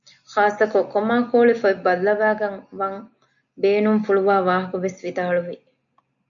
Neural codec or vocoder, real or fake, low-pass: none; real; 7.2 kHz